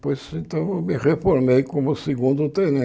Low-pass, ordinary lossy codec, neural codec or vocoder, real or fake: none; none; none; real